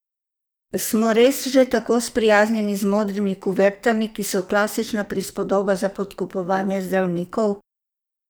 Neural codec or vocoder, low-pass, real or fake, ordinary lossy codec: codec, 44.1 kHz, 2.6 kbps, SNAC; none; fake; none